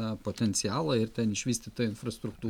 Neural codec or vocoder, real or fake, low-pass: none; real; 19.8 kHz